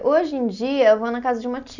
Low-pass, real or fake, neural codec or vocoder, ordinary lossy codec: 7.2 kHz; real; none; none